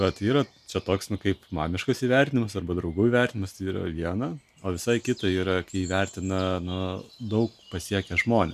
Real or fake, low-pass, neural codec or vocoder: real; 14.4 kHz; none